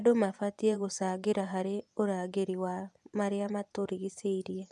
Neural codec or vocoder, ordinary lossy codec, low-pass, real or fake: vocoder, 24 kHz, 100 mel bands, Vocos; none; none; fake